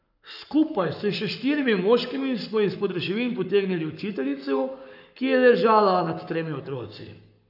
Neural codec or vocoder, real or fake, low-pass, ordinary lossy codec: codec, 44.1 kHz, 7.8 kbps, Pupu-Codec; fake; 5.4 kHz; none